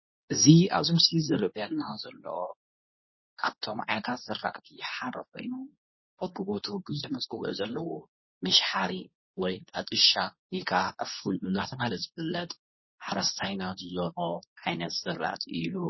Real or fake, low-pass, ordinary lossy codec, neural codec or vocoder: fake; 7.2 kHz; MP3, 24 kbps; codec, 24 kHz, 0.9 kbps, WavTokenizer, medium speech release version 2